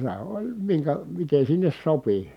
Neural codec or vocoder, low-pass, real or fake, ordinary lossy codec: none; 19.8 kHz; real; none